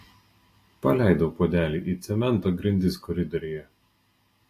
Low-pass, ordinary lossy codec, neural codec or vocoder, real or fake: 14.4 kHz; AAC, 48 kbps; none; real